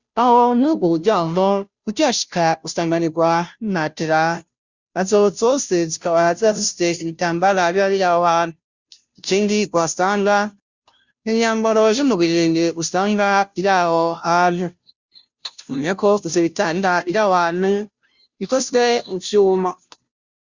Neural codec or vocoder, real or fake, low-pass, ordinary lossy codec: codec, 16 kHz, 0.5 kbps, FunCodec, trained on Chinese and English, 25 frames a second; fake; 7.2 kHz; Opus, 64 kbps